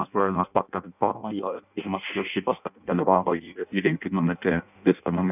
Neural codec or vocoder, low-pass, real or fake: codec, 16 kHz in and 24 kHz out, 0.6 kbps, FireRedTTS-2 codec; 3.6 kHz; fake